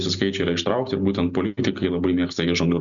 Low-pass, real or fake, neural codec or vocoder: 7.2 kHz; real; none